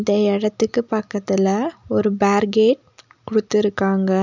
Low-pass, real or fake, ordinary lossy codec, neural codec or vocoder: 7.2 kHz; real; none; none